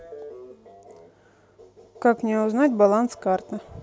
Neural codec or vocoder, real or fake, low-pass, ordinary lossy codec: none; real; none; none